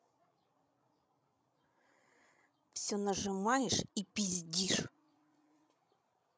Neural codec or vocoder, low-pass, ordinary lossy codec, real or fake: codec, 16 kHz, 16 kbps, FreqCodec, larger model; none; none; fake